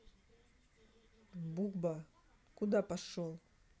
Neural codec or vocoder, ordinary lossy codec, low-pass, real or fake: none; none; none; real